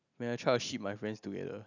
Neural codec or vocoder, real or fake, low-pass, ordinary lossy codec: none; real; 7.2 kHz; none